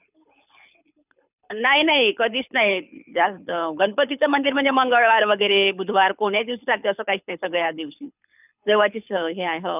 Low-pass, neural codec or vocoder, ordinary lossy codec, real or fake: 3.6 kHz; codec, 24 kHz, 6 kbps, HILCodec; none; fake